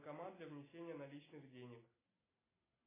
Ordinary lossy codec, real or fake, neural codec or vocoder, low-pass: AAC, 16 kbps; real; none; 3.6 kHz